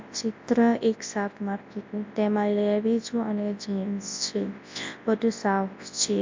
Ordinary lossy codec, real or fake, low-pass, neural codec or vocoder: MP3, 48 kbps; fake; 7.2 kHz; codec, 24 kHz, 0.9 kbps, WavTokenizer, large speech release